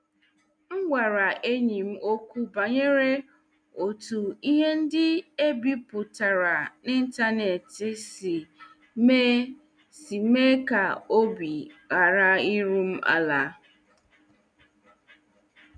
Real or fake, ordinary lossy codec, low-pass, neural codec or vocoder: real; none; 9.9 kHz; none